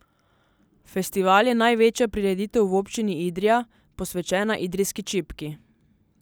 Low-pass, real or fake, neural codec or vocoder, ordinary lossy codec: none; real; none; none